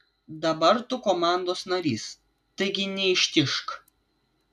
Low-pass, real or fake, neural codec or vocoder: 14.4 kHz; real; none